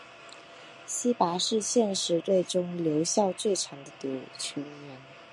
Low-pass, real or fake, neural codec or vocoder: 10.8 kHz; real; none